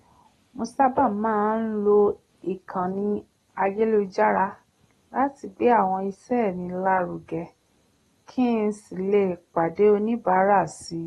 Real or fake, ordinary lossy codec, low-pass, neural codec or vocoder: real; AAC, 32 kbps; 19.8 kHz; none